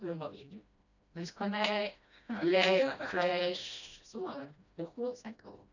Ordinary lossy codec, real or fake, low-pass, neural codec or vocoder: none; fake; 7.2 kHz; codec, 16 kHz, 1 kbps, FreqCodec, smaller model